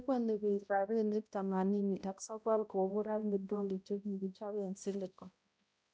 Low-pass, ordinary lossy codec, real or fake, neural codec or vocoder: none; none; fake; codec, 16 kHz, 0.5 kbps, X-Codec, HuBERT features, trained on balanced general audio